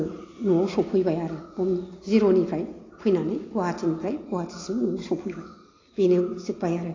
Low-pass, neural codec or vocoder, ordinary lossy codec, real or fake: 7.2 kHz; none; AAC, 32 kbps; real